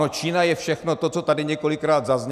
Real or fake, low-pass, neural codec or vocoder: real; 14.4 kHz; none